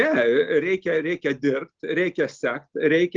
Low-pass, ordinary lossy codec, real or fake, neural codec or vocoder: 7.2 kHz; Opus, 16 kbps; real; none